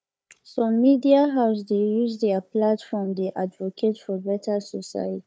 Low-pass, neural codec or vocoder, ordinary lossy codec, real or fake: none; codec, 16 kHz, 4 kbps, FunCodec, trained on Chinese and English, 50 frames a second; none; fake